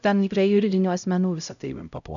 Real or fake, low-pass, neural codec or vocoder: fake; 7.2 kHz; codec, 16 kHz, 0.5 kbps, X-Codec, HuBERT features, trained on LibriSpeech